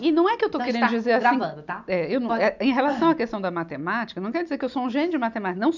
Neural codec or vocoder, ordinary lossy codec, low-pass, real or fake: none; none; 7.2 kHz; real